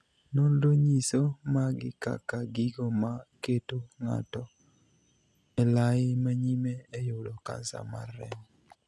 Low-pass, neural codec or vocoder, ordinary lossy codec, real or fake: none; none; none; real